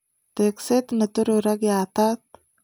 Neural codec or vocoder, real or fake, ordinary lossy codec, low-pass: none; real; none; none